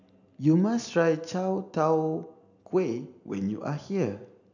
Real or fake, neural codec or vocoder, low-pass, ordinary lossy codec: real; none; 7.2 kHz; none